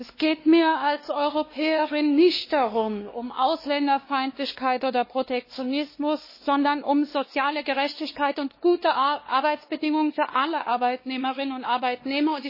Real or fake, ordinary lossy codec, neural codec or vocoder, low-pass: fake; MP3, 24 kbps; codec, 16 kHz, 2 kbps, X-Codec, WavLM features, trained on Multilingual LibriSpeech; 5.4 kHz